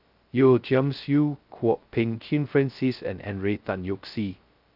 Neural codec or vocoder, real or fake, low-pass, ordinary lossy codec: codec, 16 kHz, 0.2 kbps, FocalCodec; fake; 5.4 kHz; Opus, 24 kbps